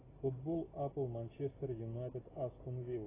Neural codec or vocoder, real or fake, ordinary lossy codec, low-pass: none; real; AAC, 16 kbps; 3.6 kHz